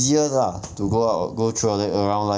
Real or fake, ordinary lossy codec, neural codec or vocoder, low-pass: real; none; none; none